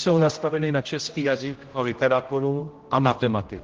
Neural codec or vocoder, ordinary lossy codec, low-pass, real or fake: codec, 16 kHz, 0.5 kbps, X-Codec, HuBERT features, trained on general audio; Opus, 16 kbps; 7.2 kHz; fake